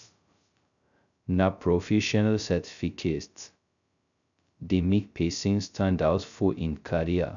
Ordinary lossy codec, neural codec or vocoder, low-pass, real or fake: MP3, 96 kbps; codec, 16 kHz, 0.2 kbps, FocalCodec; 7.2 kHz; fake